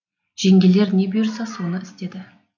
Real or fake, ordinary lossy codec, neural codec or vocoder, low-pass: real; none; none; 7.2 kHz